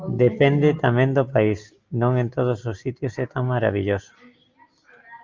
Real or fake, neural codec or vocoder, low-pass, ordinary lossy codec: real; none; 7.2 kHz; Opus, 24 kbps